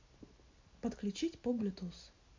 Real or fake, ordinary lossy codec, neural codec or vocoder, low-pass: real; MP3, 64 kbps; none; 7.2 kHz